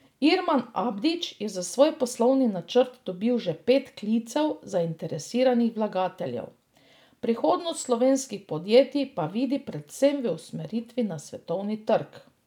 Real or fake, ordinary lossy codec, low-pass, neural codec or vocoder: real; none; 19.8 kHz; none